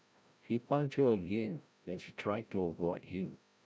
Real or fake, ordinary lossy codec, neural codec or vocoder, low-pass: fake; none; codec, 16 kHz, 0.5 kbps, FreqCodec, larger model; none